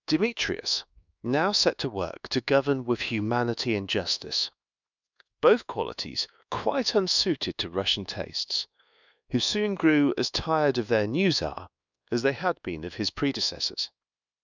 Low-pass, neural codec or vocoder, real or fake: 7.2 kHz; codec, 24 kHz, 1.2 kbps, DualCodec; fake